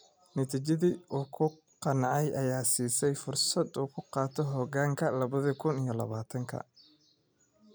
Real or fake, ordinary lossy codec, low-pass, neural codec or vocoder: real; none; none; none